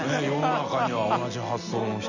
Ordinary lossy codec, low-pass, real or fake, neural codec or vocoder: none; 7.2 kHz; real; none